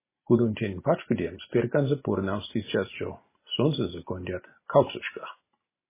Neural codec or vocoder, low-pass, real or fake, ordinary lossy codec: none; 3.6 kHz; real; MP3, 16 kbps